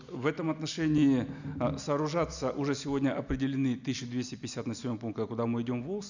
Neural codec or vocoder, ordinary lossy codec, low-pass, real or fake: none; none; 7.2 kHz; real